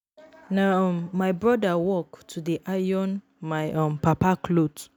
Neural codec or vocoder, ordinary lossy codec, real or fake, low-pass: none; none; real; none